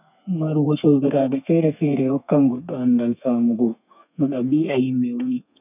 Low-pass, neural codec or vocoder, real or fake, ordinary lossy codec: 3.6 kHz; codec, 32 kHz, 1.9 kbps, SNAC; fake; none